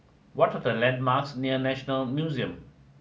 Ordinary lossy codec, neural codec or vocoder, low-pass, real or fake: none; none; none; real